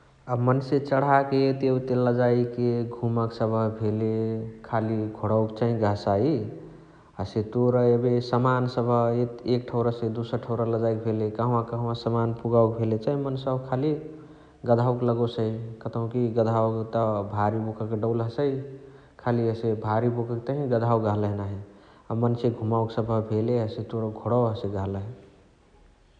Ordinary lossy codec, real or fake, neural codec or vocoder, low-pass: none; real; none; 9.9 kHz